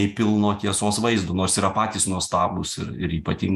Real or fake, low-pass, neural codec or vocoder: fake; 14.4 kHz; vocoder, 48 kHz, 128 mel bands, Vocos